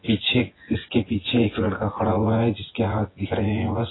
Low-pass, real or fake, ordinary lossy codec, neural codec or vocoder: 7.2 kHz; fake; AAC, 16 kbps; vocoder, 24 kHz, 100 mel bands, Vocos